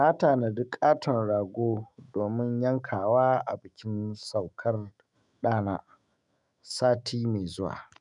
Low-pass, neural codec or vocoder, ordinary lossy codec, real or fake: 10.8 kHz; autoencoder, 48 kHz, 128 numbers a frame, DAC-VAE, trained on Japanese speech; none; fake